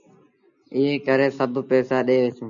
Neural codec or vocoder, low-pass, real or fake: none; 7.2 kHz; real